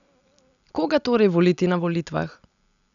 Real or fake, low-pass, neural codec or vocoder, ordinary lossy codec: real; 7.2 kHz; none; none